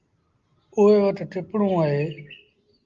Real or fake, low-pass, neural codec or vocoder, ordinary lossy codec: real; 7.2 kHz; none; Opus, 32 kbps